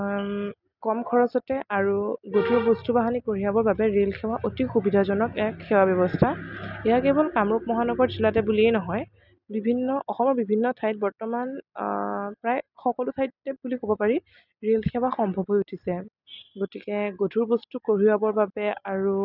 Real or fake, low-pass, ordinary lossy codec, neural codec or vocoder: real; 5.4 kHz; none; none